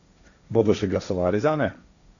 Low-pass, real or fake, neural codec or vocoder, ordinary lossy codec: 7.2 kHz; fake; codec, 16 kHz, 1.1 kbps, Voila-Tokenizer; none